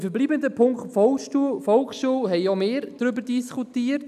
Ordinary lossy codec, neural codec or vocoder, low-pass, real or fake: none; none; 14.4 kHz; real